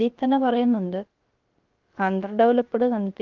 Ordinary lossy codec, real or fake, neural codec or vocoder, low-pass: Opus, 32 kbps; fake; codec, 16 kHz, about 1 kbps, DyCAST, with the encoder's durations; 7.2 kHz